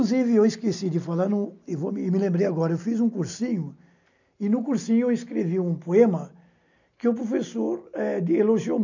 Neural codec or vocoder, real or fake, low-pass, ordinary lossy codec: none; real; 7.2 kHz; none